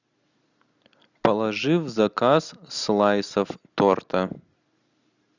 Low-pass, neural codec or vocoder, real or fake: 7.2 kHz; none; real